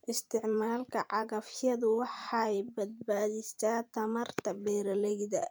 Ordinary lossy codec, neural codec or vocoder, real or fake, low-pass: none; vocoder, 44.1 kHz, 128 mel bands every 512 samples, BigVGAN v2; fake; none